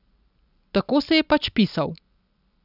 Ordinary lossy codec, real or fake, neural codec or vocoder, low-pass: none; real; none; 5.4 kHz